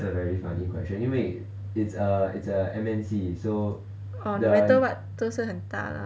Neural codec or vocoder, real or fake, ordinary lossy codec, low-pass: none; real; none; none